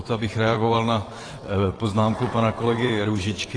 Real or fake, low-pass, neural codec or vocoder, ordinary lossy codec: fake; 9.9 kHz; vocoder, 22.05 kHz, 80 mel bands, WaveNeXt; AAC, 32 kbps